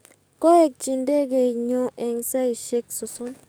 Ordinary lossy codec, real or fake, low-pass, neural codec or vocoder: none; fake; none; codec, 44.1 kHz, 7.8 kbps, DAC